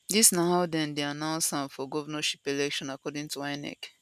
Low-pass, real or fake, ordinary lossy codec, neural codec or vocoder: 14.4 kHz; real; none; none